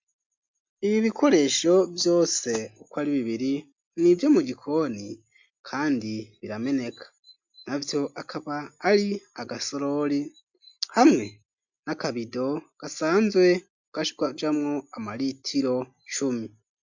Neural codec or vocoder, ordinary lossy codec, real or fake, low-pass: none; MP3, 64 kbps; real; 7.2 kHz